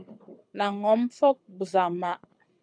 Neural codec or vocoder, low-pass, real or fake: codec, 24 kHz, 6 kbps, HILCodec; 9.9 kHz; fake